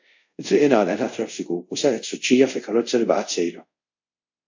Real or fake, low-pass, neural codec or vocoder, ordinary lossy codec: fake; 7.2 kHz; codec, 24 kHz, 0.5 kbps, DualCodec; AAC, 48 kbps